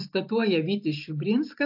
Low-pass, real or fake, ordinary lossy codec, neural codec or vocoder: 5.4 kHz; real; AAC, 48 kbps; none